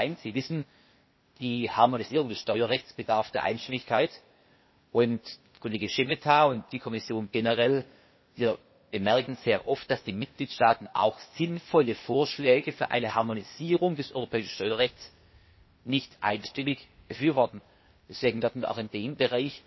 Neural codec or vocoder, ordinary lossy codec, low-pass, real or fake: codec, 16 kHz, 0.8 kbps, ZipCodec; MP3, 24 kbps; 7.2 kHz; fake